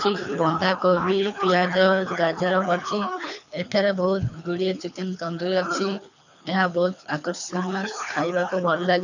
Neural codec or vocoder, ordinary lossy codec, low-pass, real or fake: codec, 24 kHz, 3 kbps, HILCodec; none; 7.2 kHz; fake